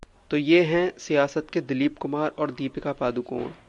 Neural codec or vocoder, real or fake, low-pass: none; real; 10.8 kHz